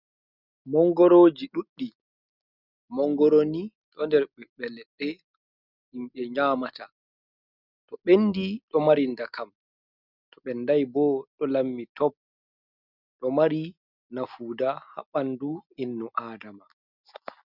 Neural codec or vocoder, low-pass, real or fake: none; 5.4 kHz; real